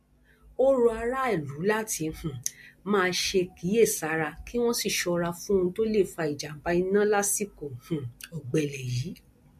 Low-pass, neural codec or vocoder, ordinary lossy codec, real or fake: 14.4 kHz; none; MP3, 64 kbps; real